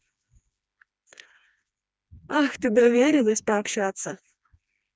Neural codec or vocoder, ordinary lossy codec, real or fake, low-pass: codec, 16 kHz, 2 kbps, FreqCodec, smaller model; none; fake; none